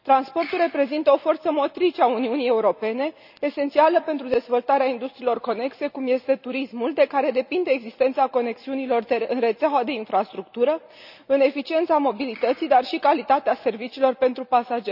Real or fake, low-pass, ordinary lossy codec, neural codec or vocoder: real; 5.4 kHz; none; none